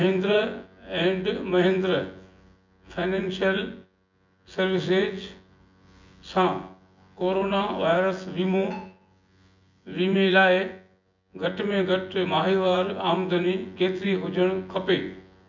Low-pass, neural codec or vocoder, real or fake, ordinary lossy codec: 7.2 kHz; vocoder, 24 kHz, 100 mel bands, Vocos; fake; MP3, 64 kbps